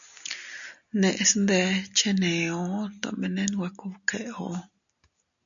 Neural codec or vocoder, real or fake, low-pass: none; real; 7.2 kHz